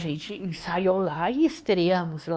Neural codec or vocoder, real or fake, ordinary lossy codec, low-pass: codec, 16 kHz, 2 kbps, X-Codec, WavLM features, trained on Multilingual LibriSpeech; fake; none; none